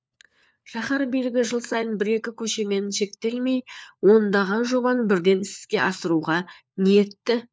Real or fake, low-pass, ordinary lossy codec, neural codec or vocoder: fake; none; none; codec, 16 kHz, 4 kbps, FunCodec, trained on LibriTTS, 50 frames a second